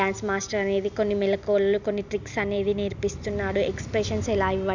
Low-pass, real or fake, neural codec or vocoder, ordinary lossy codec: 7.2 kHz; real; none; none